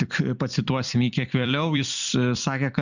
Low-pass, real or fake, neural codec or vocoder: 7.2 kHz; fake; vocoder, 44.1 kHz, 128 mel bands every 256 samples, BigVGAN v2